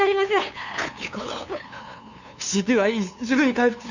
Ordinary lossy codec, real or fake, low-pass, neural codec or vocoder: none; fake; 7.2 kHz; codec, 16 kHz, 2 kbps, FunCodec, trained on LibriTTS, 25 frames a second